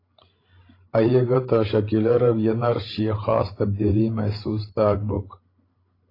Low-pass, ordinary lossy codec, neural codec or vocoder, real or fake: 5.4 kHz; AAC, 24 kbps; codec, 16 kHz, 16 kbps, FreqCodec, larger model; fake